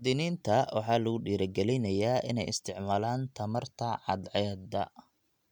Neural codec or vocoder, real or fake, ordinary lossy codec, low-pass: none; real; none; 19.8 kHz